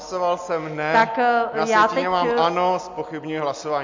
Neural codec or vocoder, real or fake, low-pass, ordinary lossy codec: none; real; 7.2 kHz; MP3, 48 kbps